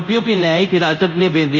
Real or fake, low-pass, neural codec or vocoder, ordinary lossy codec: fake; 7.2 kHz; codec, 24 kHz, 0.5 kbps, DualCodec; none